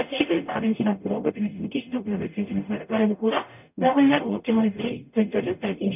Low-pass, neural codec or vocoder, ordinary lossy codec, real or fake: 3.6 kHz; codec, 44.1 kHz, 0.9 kbps, DAC; none; fake